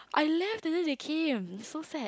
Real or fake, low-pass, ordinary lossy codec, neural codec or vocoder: real; none; none; none